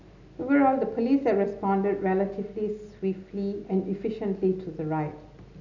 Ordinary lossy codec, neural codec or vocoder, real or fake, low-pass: none; none; real; 7.2 kHz